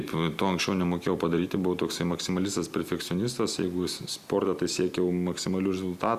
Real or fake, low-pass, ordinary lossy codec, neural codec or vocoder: real; 14.4 kHz; Opus, 64 kbps; none